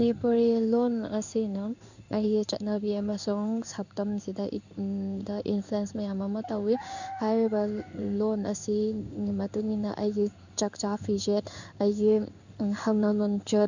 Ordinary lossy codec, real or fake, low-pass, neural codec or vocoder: none; fake; 7.2 kHz; codec, 16 kHz in and 24 kHz out, 1 kbps, XY-Tokenizer